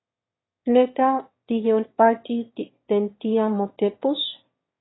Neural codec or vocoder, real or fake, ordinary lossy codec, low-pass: autoencoder, 22.05 kHz, a latent of 192 numbers a frame, VITS, trained on one speaker; fake; AAC, 16 kbps; 7.2 kHz